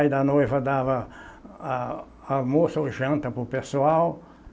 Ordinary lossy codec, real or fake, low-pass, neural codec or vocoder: none; real; none; none